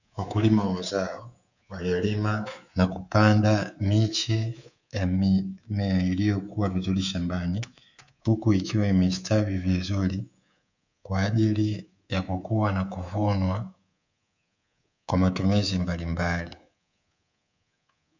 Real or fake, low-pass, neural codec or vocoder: fake; 7.2 kHz; codec, 24 kHz, 3.1 kbps, DualCodec